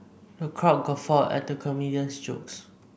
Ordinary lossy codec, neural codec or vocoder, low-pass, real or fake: none; none; none; real